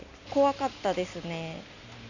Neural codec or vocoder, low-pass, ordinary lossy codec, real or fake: none; 7.2 kHz; none; real